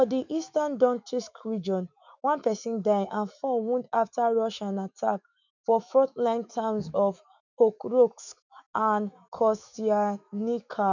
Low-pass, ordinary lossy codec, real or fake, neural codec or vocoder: 7.2 kHz; none; fake; autoencoder, 48 kHz, 128 numbers a frame, DAC-VAE, trained on Japanese speech